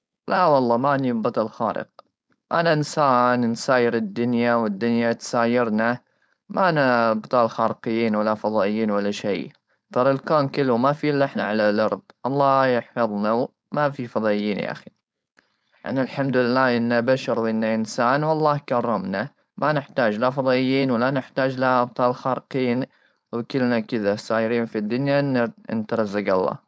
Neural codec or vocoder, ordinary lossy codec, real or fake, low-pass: codec, 16 kHz, 4.8 kbps, FACodec; none; fake; none